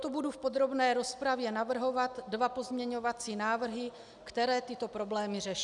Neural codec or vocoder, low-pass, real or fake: none; 10.8 kHz; real